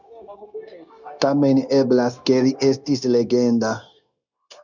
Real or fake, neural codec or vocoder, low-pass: fake; codec, 16 kHz, 0.9 kbps, LongCat-Audio-Codec; 7.2 kHz